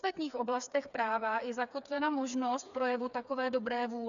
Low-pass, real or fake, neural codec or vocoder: 7.2 kHz; fake; codec, 16 kHz, 4 kbps, FreqCodec, smaller model